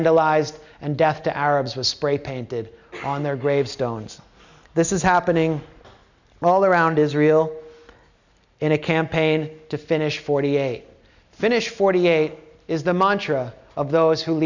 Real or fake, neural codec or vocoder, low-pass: real; none; 7.2 kHz